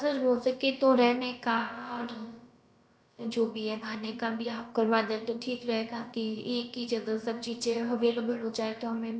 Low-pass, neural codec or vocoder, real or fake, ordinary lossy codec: none; codec, 16 kHz, about 1 kbps, DyCAST, with the encoder's durations; fake; none